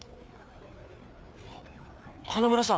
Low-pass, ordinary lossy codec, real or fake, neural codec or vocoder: none; none; fake; codec, 16 kHz, 4 kbps, FreqCodec, larger model